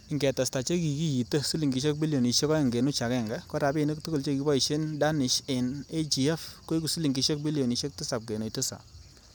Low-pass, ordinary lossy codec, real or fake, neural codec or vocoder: none; none; real; none